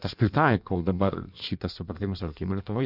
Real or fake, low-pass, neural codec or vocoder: fake; 5.4 kHz; codec, 16 kHz in and 24 kHz out, 1.1 kbps, FireRedTTS-2 codec